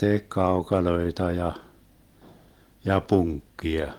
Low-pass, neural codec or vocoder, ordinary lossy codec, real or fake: 19.8 kHz; vocoder, 44.1 kHz, 128 mel bands every 256 samples, BigVGAN v2; Opus, 24 kbps; fake